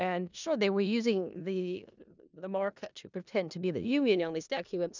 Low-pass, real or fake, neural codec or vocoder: 7.2 kHz; fake; codec, 16 kHz in and 24 kHz out, 0.4 kbps, LongCat-Audio-Codec, four codebook decoder